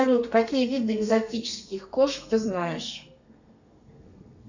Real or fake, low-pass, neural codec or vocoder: fake; 7.2 kHz; codec, 32 kHz, 1.9 kbps, SNAC